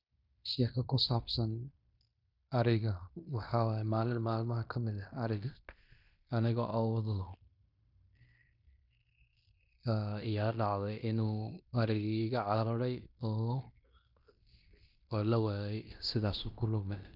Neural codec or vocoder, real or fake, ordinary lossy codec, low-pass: codec, 16 kHz in and 24 kHz out, 0.9 kbps, LongCat-Audio-Codec, fine tuned four codebook decoder; fake; none; 5.4 kHz